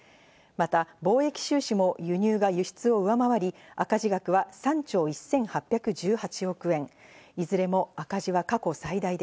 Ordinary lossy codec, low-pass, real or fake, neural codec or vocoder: none; none; real; none